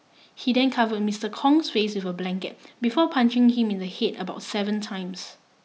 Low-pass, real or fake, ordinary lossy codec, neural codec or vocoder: none; real; none; none